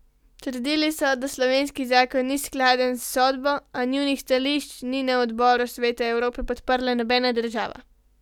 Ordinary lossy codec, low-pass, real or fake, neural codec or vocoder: none; 19.8 kHz; real; none